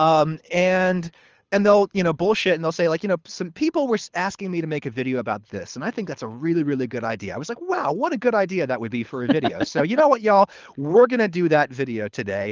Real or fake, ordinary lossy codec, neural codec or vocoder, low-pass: fake; Opus, 24 kbps; codec, 24 kHz, 6 kbps, HILCodec; 7.2 kHz